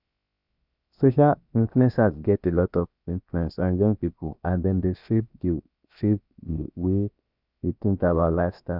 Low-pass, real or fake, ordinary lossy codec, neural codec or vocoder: 5.4 kHz; fake; Opus, 64 kbps; codec, 16 kHz, 0.7 kbps, FocalCodec